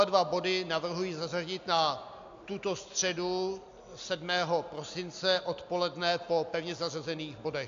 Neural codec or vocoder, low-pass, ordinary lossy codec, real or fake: none; 7.2 kHz; AAC, 64 kbps; real